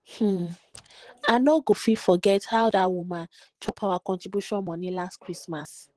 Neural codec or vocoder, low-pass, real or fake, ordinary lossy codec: vocoder, 44.1 kHz, 128 mel bands, Pupu-Vocoder; 10.8 kHz; fake; Opus, 16 kbps